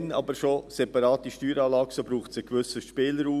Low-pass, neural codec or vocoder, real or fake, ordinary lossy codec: 14.4 kHz; none; real; none